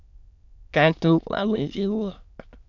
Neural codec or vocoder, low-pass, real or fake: autoencoder, 22.05 kHz, a latent of 192 numbers a frame, VITS, trained on many speakers; 7.2 kHz; fake